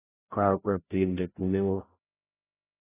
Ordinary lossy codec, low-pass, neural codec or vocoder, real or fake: AAC, 16 kbps; 3.6 kHz; codec, 16 kHz, 0.5 kbps, FreqCodec, larger model; fake